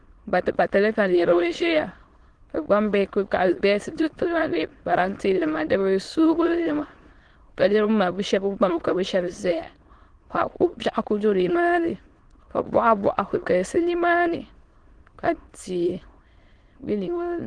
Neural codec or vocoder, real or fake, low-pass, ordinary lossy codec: autoencoder, 22.05 kHz, a latent of 192 numbers a frame, VITS, trained on many speakers; fake; 9.9 kHz; Opus, 16 kbps